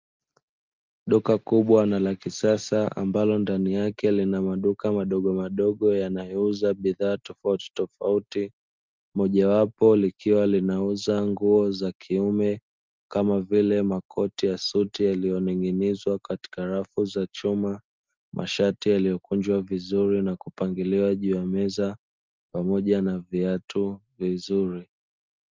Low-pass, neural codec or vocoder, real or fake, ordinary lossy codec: 7.2 kHz; none; real; Opus, 24 kbps